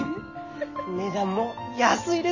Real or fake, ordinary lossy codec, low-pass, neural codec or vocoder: real; none; 7.2 kHz; none